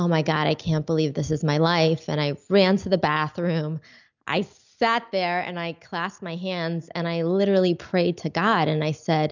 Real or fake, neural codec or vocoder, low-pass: real; none; 7.2 kHz